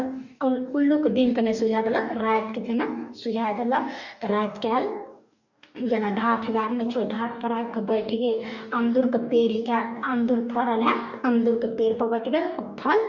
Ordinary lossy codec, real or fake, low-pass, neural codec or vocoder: none; fake; 7.2 kHz; codec, 44.1 kHz, 2.6 kbps, DAC